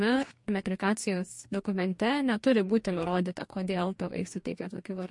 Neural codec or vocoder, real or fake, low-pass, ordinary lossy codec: codec, 44.1 kHz, 2.6 kbps, DAC; fake; 10.8 kHz; MP3, 48 kbps